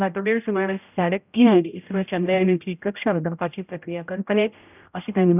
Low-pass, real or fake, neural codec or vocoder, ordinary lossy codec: 3.6 kHz; fake; codec, 16 kHz, 0.5 kbps, X-Codec, HuBERT features, trained on general audio; none